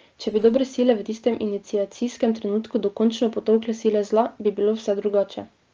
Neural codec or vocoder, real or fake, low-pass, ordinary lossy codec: none; real; 7.2 kHz; Opus, 24 kbps